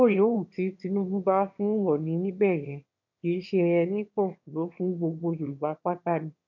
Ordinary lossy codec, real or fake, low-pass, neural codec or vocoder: none; fake; 7.2 kHz; autoencoder, 22.05 kHz, a latent of 192 numbers a frame, VITS, trained on one speaker